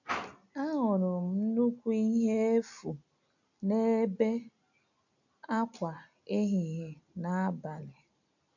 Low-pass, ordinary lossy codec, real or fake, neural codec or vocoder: 7.2 kHz; none; real; none